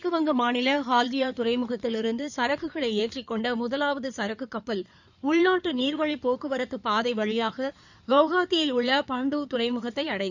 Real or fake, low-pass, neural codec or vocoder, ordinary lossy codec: fake; 7.2 kHz; codec, 16 kHz in and 24 kHz out, 2.2 kbps, FireRedTTS-2 codec; none